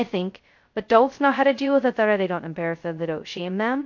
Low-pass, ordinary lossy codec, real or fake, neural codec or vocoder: 7.2 kHz; MP3, 48 kbps; fake; codec, 16 kHz, 0.2 kbps, FocalCodec